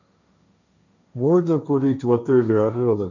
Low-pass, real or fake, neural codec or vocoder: 7.2 kHz; fake; codec, 16 kHz, 1.1 kbps, Voila-Tokenizer